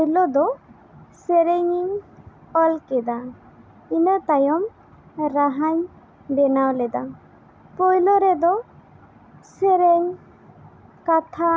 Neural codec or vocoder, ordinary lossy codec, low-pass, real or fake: none; none; none; real